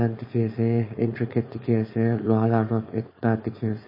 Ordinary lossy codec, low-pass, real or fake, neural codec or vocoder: MP3, 24 kbps; 5.4 kHz; fake; codec, 16 kHz, 4.8 kbps, FACodec